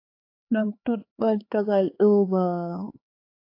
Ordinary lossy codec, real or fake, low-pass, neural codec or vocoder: AAC, 32 kbps; fake; 5.4 kHz; codec, 16 kHz, 4 kbps, X-Codec, HuBERT features, trained on LibriSpeech